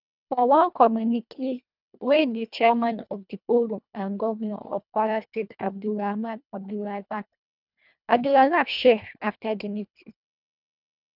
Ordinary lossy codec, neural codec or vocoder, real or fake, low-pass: none; codec, 24 kHz, 1.5 kbps, HILCodec; fake; 5.4 kHz